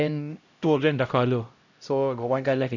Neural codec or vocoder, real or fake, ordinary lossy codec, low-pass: codec, 16 kHz, 0.5 kbps, X-Codec, HuBERT features, trained on LibriSpeech; fake; none; 7.2 kHz